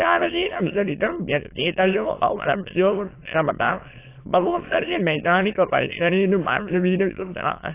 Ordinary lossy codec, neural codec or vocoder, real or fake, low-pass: AAC, 24 kbps; autoencoder, 22.05 kHz, a latent of 192 numbers a frame, VITS, trained on many speakers; fake; 3.6 kHz